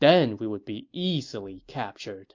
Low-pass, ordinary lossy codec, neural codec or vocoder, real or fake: 7.2 kHz; MP3, 48 kbps; none; real